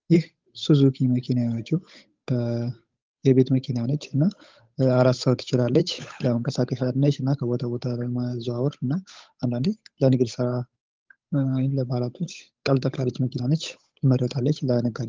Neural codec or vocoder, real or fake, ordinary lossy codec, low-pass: codec, 16 kHz, 8 kbps, FunCodec, trained on Chinese and English, 25 frames a second; fake; Opus, 32 kbps; 7.2 kHz